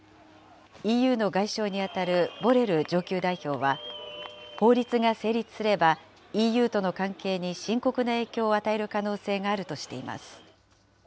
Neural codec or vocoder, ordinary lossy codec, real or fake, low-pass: none; none; real; none